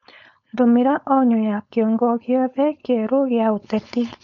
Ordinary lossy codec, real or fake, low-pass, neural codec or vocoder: none; fake; 7.2 kHz; codec, 16 kHz, 4.8 kbps, FACodec